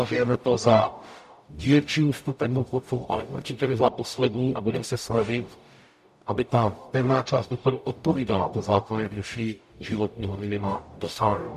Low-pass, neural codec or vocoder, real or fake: 14.4 kHz; codec, 44.1 kHz, 0.9 kbps, DAC; fake